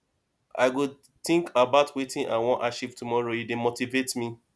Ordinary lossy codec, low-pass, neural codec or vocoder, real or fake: none; none; none; real